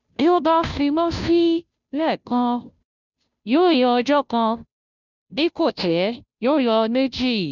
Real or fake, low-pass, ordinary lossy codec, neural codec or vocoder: fake; 7.2 kHz; none; codec, 16 kHz, 0.5 kbps, FunCodec, trained on Chinese and English, 25 frames a second